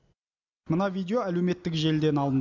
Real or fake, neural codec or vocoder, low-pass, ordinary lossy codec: real; none; 7.2 kHz; none